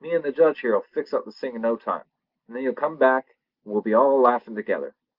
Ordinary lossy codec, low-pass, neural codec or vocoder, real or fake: Opus, 32 kbps; 5.4 kHz; none; real